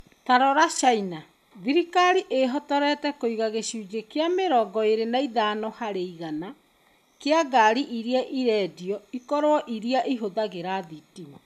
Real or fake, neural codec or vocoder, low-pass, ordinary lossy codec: real; none; 14.4 kHz; none